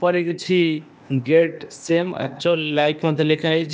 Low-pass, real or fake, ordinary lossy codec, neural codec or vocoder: none; fake; none; codec, 16 kHz, 0.8 kbps, ZipCodec